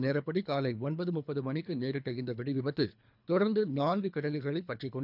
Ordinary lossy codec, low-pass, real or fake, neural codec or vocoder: none; 5.4 kHz; fake; codec, 24 kHz, 3 kbps, HILCodec